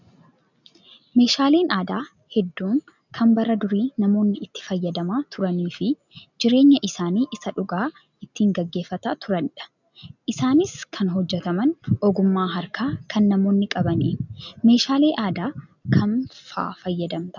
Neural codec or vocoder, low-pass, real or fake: none; 7.2 kHz; real